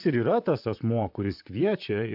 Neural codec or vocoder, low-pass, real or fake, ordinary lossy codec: vocoder, 44.1 kHz, 128 mel bands, Pupu-Vocoder; 5.4 kHz; fake; AAC, 48 kbps